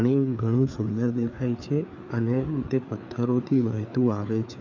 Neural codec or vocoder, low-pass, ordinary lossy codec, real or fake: codec, 16 kHz, 4 kbps, FreqCodec, larger model; 7.2 kHz; none; fake